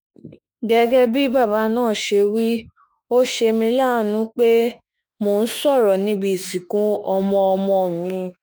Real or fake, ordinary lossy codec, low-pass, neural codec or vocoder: fake; none; none; autoencoder, 48 kHz, 32 numbers a frame, DAC-VAE, trained on Japanese speech